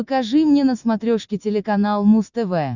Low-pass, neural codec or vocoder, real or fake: 7.2 kHz; none; real